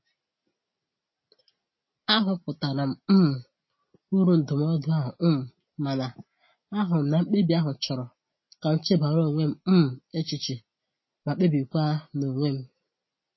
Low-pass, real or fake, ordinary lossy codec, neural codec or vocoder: 7.2 kHz; real; MP3, 24 kbps; none